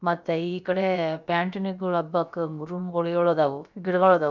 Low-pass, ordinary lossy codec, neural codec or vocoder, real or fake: 7.2 kHz; none; codec, 16 kHz, about 1 kbps, DyCAST, with the encoder's durations; fake